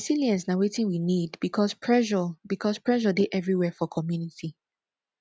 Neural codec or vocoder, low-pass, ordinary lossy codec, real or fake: none; none; none; real